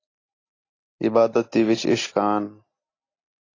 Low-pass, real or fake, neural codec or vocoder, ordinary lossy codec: 7.2 kHz; real; none; AAC, 32 kbps